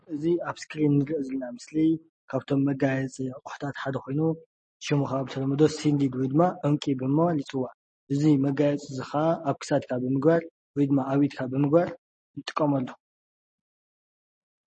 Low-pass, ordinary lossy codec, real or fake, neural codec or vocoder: 10.8 kHz; MP3, 32 kbps; real; none